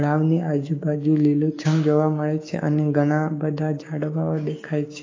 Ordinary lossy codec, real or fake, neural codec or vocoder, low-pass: AAC, 32 kbps; fake; codec, 16 kHz, 6 kbps, DAC; 7.2 kHz